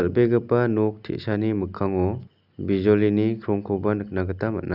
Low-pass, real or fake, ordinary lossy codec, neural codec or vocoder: 5.4 kHz; real; none; none